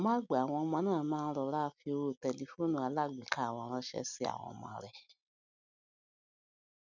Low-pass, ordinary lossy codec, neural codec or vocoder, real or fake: 7.2 kHz; none; none; real